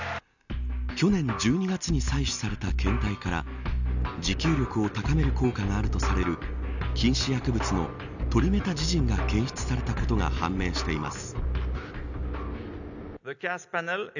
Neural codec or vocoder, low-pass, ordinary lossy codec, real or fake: none; 7.2 kHz; none; real